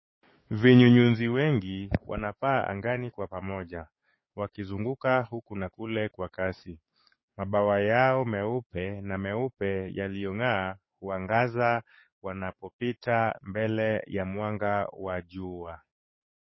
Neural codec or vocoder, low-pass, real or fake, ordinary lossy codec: codec, 44.1 kHz, 7.8 kbps, DAC; 7.2 kHz; fake; MP3, 24 kbps